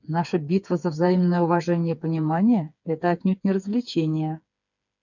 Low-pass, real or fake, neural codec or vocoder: 7.2 kHz; fake; codec, 16 kHz, 4 kbps, FreqCodec, smaller model